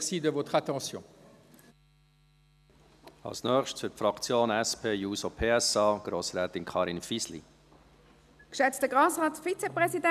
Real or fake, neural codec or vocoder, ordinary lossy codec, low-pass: real; none; none; 14.4 kHz